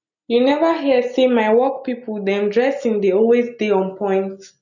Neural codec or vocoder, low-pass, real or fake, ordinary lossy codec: none; 7.2 kHz; real; none